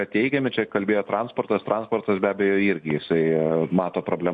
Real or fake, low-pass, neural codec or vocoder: real; 9.9 kHz; none